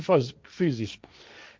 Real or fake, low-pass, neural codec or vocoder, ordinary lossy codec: fake; none; codec, 16 kHz, 1.1 kbps, Voila-Tokenizer; none